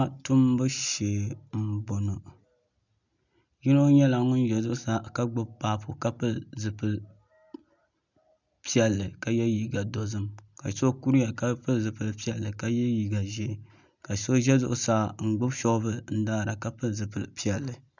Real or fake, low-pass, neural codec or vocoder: real; 7.2 kHz; none